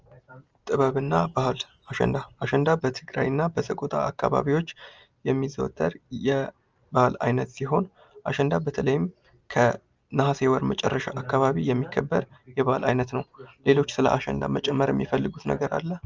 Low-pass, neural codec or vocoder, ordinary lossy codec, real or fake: 7.2 kHz; none; Opus, 24 kbps; real